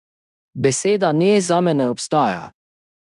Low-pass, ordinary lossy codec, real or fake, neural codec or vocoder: 10.8 kHz; none; fake; codec, 16 kHz in and 24 kHz out, 0.9 kbps, LongCat-Audio-Codec, fine tuned four codebook decoder